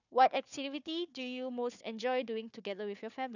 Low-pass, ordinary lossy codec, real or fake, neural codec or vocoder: 7.2 kHz; none; fake; codec, 16 kHz, 4 kbps, FunCodec, trained on Chinese and English, 50 frames a second